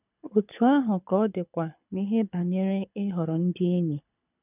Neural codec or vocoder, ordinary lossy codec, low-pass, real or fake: codec, 24 kHz, 6 kbps, HILCodec; none; 3.6 kHz; fake